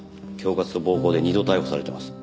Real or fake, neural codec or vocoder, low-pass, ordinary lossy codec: real; none; none; none